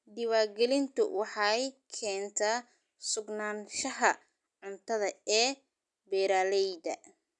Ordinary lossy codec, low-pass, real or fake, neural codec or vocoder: none; 10.8 kHz; real; none